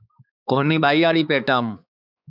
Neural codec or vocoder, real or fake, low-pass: codec, 16 kHz, 4 kbps, X-Codec, HuBERT features, trained on balanced general audio; fake; 5.4 kHz